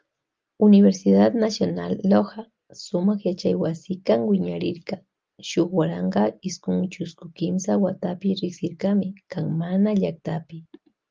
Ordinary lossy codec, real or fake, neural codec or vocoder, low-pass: Opus, 24 kbps; real; none; 7.2 kHz